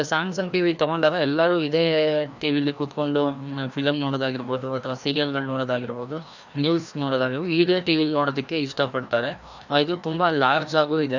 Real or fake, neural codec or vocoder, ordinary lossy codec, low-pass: fake; codec, 16 kHz, 1 kbps, FreqCodec, larger model; none; 7.2 kHz